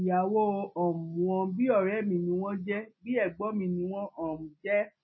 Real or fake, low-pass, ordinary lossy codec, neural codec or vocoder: real; 7.2 kHz; MP3, 24 kbps; none